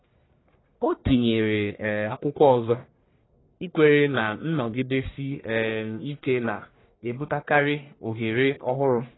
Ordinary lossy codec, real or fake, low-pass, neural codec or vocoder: AAC, 16 kbps; fake; 7.2 kHz; codec, 44.1 kHz, 1.7 kbps, Pupu-Codec